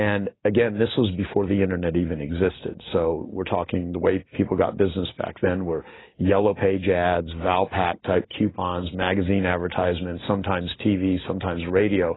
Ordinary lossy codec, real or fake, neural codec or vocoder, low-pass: AAC, 16 kbps; real; none; 7.2 kHz